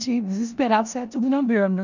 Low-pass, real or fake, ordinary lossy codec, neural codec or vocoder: 7.2 kHz; fake; none; codec, 16 kHz in and 24 kHz out, 0.9 kbps, LongCat-Audio-Codec, four codebook decoder